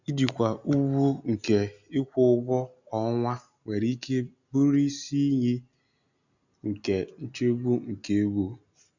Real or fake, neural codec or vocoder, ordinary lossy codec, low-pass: real; none; none; 7.2 kHz